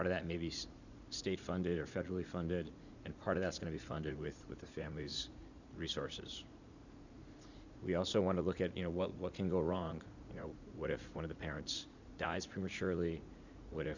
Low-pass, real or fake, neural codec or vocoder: 7.2 kHz; fake; vocoder, 44.1 kHz, 80 mel bands, Vocos